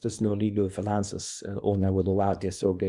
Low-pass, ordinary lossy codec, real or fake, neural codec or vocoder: 10.8 kHz; Opus, 64 kbps; fake; codec, 24 kHz, 0.9 kbps, WavTokenizer, small release